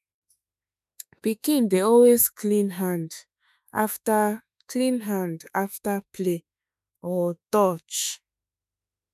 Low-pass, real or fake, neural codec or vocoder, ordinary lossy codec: 14.4 kHz; fake; autoencoder, 48 kHz, 32 numbers a frame, DAC-VAE, trained on Japanese speech; none